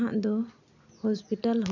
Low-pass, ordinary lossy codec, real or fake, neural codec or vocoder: 7.2 kHz; none; real; none